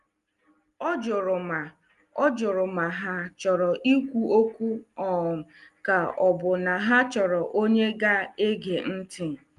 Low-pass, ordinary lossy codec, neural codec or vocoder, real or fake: 10.8 kHz; Opus, 32 kbps; none; real